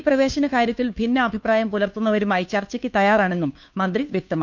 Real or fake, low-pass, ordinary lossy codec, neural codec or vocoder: fake; 7.2 kHz; none; codec, 16 kHz, 2 kbps, FunCodec, trained on Chinese and English, 25 frames a second